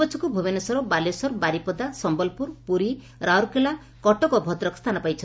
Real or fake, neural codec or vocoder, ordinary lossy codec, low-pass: real; none; none; none